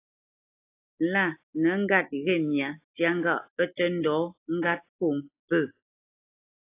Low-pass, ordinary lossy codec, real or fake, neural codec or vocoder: 3.6 kHz; AAC, 24 kbps; real; none